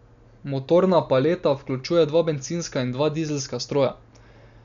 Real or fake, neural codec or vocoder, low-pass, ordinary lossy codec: real; none; 7.2 kHz; none